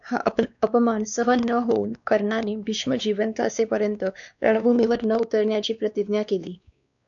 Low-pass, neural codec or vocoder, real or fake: 7.2 kHz; codec, 16 kHz, 2 kbps, X-Codec, WavLM features, trained on Multilingual LibriSpeech; fake